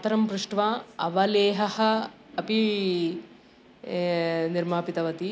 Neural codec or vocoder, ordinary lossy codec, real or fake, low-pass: none; none; real; none